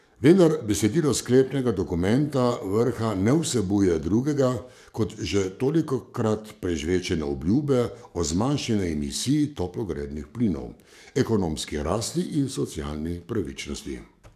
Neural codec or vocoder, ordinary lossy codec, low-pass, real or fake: codec, 44.1 kHz, 7.8 kbps, DAC; none; 14.4 kHz; fake